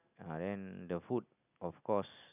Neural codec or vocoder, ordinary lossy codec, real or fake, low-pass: none; none; real; 3.6 kHz